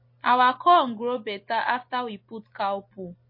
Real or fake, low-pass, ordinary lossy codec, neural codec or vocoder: real; 5.4 kHz; MP3, 24 kbps; none